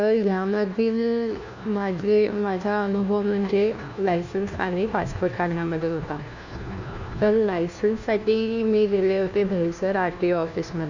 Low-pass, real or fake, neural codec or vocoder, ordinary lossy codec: 7.2 kHz; fake; codec, 16 kHz, 1 kbps, FunCodec, trained on LibriTTS, 50 frames a second; none